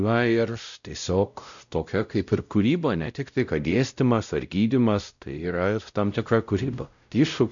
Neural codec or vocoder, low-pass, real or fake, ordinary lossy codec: codec, 16 kHz, 0.5 kbps, X-Codec, WavLM features, trained on Multilingual LibriSpeech; 7.2 kHz; fake; MP3, 96 kbps